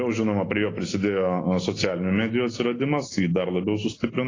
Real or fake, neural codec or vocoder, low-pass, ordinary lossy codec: real; none; 7.2 kHz; AAC, 32 kbps